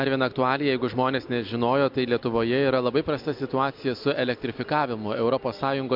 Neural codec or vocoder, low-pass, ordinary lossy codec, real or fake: none; 5.4 kHz; MP3, 48 kbps; real